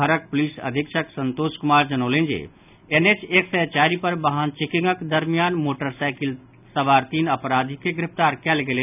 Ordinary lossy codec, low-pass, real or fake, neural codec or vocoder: none; 3.6 kHz; real; none